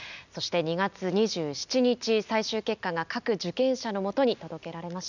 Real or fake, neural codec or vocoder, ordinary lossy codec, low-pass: real; none; none; 7.2 kHz